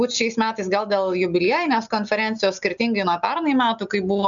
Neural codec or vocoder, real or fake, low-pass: none; real; 7.2 kHz